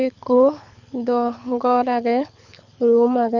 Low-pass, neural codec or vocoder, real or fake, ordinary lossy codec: 7.2 kHz; codec, 24 kHz, 6 kbps, HILCodec; fake; none